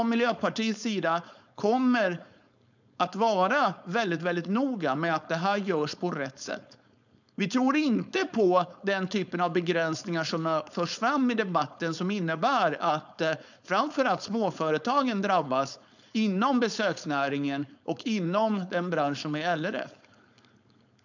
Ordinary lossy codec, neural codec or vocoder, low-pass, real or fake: none; codec, 16 kHz, 4.8 kbps, FACodec; 7.2 kHz; fake